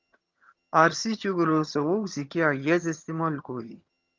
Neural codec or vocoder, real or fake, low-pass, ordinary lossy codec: vocoder, 22.05 kHz, 80 mel bands, HiFi-GAN; fake; 7.2 kHz; Opus, 32 kbps